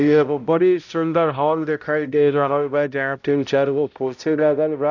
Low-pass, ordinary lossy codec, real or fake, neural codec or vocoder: 7.2 kHz; none; fake; codec, 16 kHz, 0.5 kbps, X-Codec, HuBERT features, trained on balanced general audio